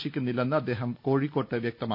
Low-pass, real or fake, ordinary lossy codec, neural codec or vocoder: 5.4 kHz; real; none; none